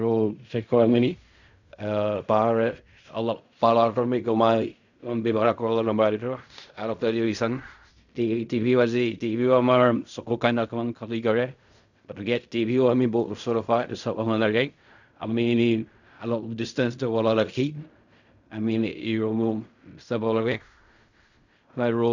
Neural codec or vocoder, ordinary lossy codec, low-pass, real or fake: codec, 16 kHz in and 24 kHz out, 0.4 kbps, LongCat-Audio-Codec, fine tuned four codebook decoder; none; 7.2 kHz; fake